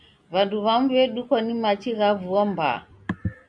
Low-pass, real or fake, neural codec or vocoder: 9.9 kHz; real; none